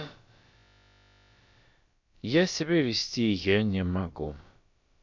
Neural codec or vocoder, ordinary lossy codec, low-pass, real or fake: codec, 16 kHz, about 1 kbps, DyCAST, with the encoder's durations; MP3, 64 kbps; 7.2 kHz; fake